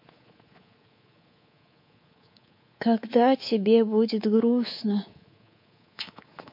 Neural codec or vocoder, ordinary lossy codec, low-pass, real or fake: codec, 24 kHz, 3.1 kbps, DualCodec; MP3, 32 kbps; 5.4 kHz; fake